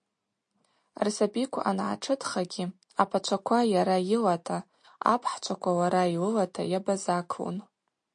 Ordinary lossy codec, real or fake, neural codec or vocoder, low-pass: MP3, 48 kbps; real; none; 9.9 kHz